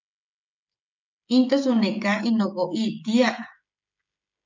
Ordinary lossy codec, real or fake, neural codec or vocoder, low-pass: MP3, 64 kbps; fake; codec, 16 kHz, 16 kbps, FreqCodec, smaller model; 7.2 kHz